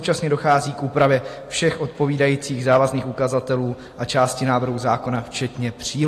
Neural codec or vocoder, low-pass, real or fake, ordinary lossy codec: none; 14.4 kHz; real; AAC, 48 kbps